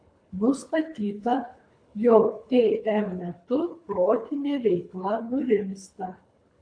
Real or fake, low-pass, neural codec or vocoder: fake; 9.9 kHz; codec, 24 kHz, 3 kbps, HILCodec